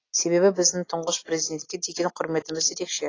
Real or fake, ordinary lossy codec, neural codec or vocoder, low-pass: real; AAC, 32 kbps; none; 7.2 kHz